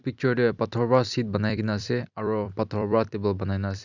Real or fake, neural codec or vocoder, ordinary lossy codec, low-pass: fake; vocoder, 44.1 kHz, 128 mel bands every 256 samples, BigVGAN v2; none; 7.2 kHz